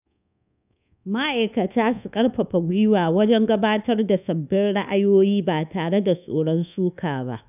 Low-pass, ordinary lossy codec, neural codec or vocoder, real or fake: 3.6 kHz; none; codec, 24 kHz, 1.2 kbps, DualCodec; fake